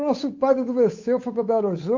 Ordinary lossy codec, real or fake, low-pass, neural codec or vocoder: MP3, 48 kbps; fake; 7.2 kHz; codec, 16 kHz, 8 kbps, FunCodec, trained on Chinese and English, 25 frames a second